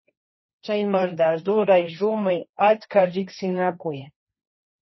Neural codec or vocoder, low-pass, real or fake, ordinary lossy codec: codec, 16 kHz, 1.1 kbps, Voila-Tokenizer; 7.2 kHz; fake; MP3, 24 kbps